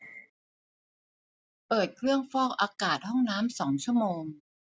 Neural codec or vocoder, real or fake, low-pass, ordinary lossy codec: none; real; none; none